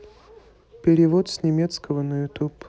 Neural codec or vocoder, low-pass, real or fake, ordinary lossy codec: none; none; real; none